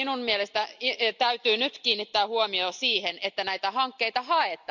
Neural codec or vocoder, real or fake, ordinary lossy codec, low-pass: none; real; none; 7.2 kHz